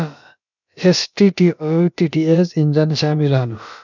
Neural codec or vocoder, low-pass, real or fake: codec, 16 kHz, about 1 kbps, DyCAST, with the encoder's durations; 7.2 kHz; fake